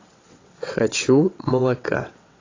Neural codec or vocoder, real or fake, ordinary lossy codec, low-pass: vocoder, 22.05 kHz, 80 mel bands, WaveNeXt; fake; AAC, 32 kbps; 7.2 kHz